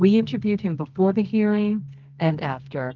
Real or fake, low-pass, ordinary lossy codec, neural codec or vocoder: fake; 7.2 kHz; Opus, 24 kbps; codec, 24 kHz, 0.9 kbps, WavTokenizer, medium music audio release